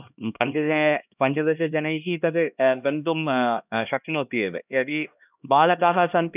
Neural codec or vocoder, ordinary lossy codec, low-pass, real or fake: codec, 16 kHz, 1 kbps, X-Codec, HuBERT features, trained on LibriSpeech; none; 3.6 kHz; fake